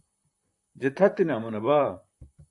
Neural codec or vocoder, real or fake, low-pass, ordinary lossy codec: vocoder, 44.1 kHz, 128 mel bands, Pupu-Vocoder; fake; 10.8 kHz; AAC, 64 kbps